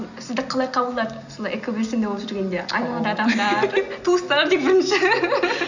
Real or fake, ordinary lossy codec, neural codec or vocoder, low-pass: real; none; none; 7.2 kHz